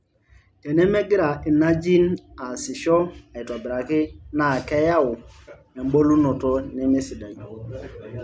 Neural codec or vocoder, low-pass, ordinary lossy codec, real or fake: none; none; none; real